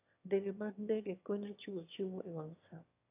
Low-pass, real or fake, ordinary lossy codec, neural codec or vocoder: 3.6 kHz; fake; AAC, 24 kbps; autoencoder, 22.05 kHz, a latent of 192 numbers a frame, VITS, trained on one speaker